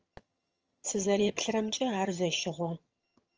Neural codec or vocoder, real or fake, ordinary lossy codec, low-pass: vocoder, 22.05 kHz, 80 mel bands, HiFi-GAN; fake; Opus, 24 kbps; 7.2 kHz